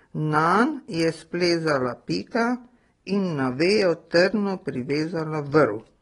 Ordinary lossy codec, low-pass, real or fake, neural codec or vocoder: AAC, 32 kbps; 10.8 kHz; real; none